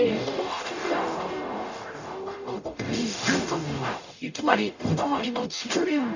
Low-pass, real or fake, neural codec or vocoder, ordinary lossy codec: 7.2 kHz; fake; codec, 44.1 kHz, 0.9 kbps, DAC; none